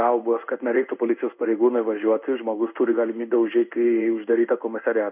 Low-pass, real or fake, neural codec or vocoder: 3.6 kHz; fake; codec, 16 kHz in and 24 kHz out, 1 kbps, XY-Tokenizer